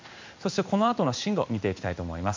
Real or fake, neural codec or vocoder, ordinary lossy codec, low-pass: fake; codec, 16 kHz in and 24 kHz out, 1 kbps, XY-Tokenizer; MP3, 64 kbps; 7.2 kHz